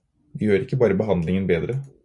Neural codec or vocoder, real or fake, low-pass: none; real; 10.8 kHz